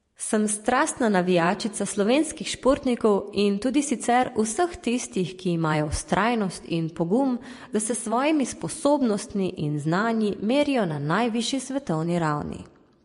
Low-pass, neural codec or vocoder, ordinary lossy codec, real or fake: 10.8 kHz; vocoder, 24 kHz, 100 mel bands, Vocos; MP3, 48 kbps; fake